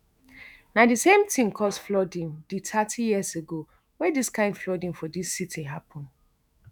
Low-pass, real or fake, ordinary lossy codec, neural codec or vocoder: none; fake; none; autoencoder, 48 kHz, 128 numbers a frame, DAC-VAE, trained on Japanese speech